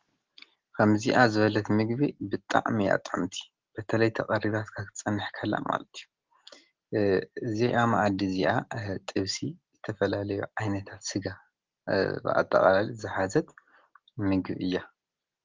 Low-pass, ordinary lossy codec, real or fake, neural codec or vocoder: 7.2 kHz; Opus, 16 kbps; real; none